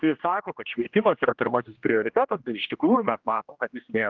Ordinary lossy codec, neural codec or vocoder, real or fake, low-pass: Opus, 32 kbps; codec, 16 kHz, 1 kbps, X-Codec, HuBERT features, trained on general audio; fake; 7.2 kHz